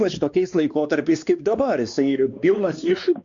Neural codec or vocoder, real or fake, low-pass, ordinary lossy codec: codec, 16 kHz, 2 kbps, X-Codec, WavLM features, trained on Multilingual LibriSpeech; fake; 7.2 kHz; Opus, 64 kbps